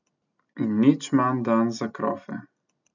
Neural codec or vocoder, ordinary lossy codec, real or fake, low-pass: none; none; real; 7.2 kHz